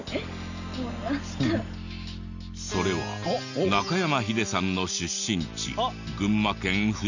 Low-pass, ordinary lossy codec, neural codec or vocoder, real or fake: 7.2 kHz; none; none; real